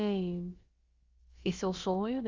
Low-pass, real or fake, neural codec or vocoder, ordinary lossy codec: 7.2 kHz; fake; codec, 16 kHz, about 1 kbps, DyCAST, with the encoder's durations; Opus, 32 kbps